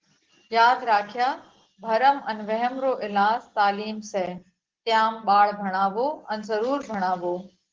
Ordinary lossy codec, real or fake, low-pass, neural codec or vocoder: Opus, 16 kbps; real; 7.2 kHz; none